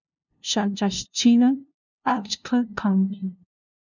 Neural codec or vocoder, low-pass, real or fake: codec, 16 kHz, 0.5 kbps, FunCodec, trained on LibriTTS, 25 frames a second; 7.2 kHz; fake